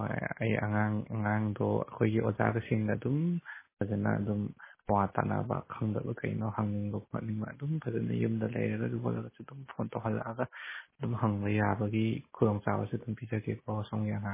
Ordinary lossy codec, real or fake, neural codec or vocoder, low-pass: MP3, 16 kbps; real; none; 3.6 kHz